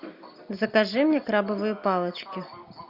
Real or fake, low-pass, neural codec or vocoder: real; 5.4 kHz; none